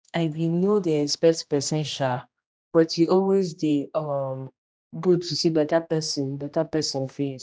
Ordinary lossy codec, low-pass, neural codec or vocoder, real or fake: none; none; codec, 16 kHz, 1 kbps, X-Codec, HuBERT features, trained on general audio; fake